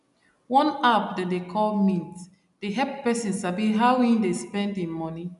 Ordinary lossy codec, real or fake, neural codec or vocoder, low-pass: none; real; none; 10.8 kHz